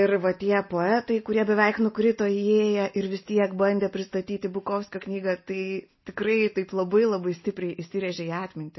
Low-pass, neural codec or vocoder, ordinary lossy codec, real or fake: 7.2 kHz; none; MP3, 24 kbps; real